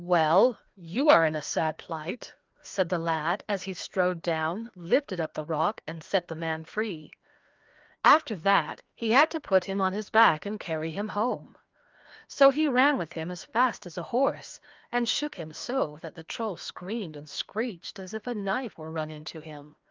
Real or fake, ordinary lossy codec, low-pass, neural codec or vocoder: fake; Opus, 24 kbps; 7.2 kHz; codec, 16 kHz, 2 kbps, FreqCodec, larger model